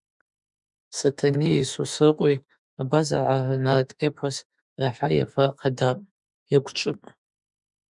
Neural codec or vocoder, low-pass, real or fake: autoencoder, 48 kHz, 32 numbers a frame, DAC-VAE, trained on Japanese speech; 10.8 kHz; fake